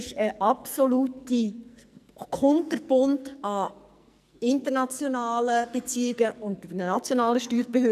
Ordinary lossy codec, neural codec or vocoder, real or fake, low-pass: none; codec, 44.1 kHz, 2.6 kbps, SNAC; fake; 14.4 kHz